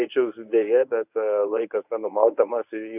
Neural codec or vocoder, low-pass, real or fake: codec, 24 kHz, 0.9 kbps, WavTokenizer, medium speech release version 1; 3.6 kHz; fake